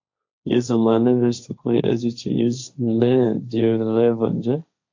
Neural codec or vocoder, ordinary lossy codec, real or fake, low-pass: codec, 16 kHz, 1.1 kbps, Voila-Tokenizer; AAC, 48 kbps; fake; 7.2 kHz